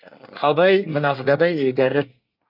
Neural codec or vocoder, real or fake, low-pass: codec, 24 kHz, 1 kbps, SNAC; fake; 5.4 kHz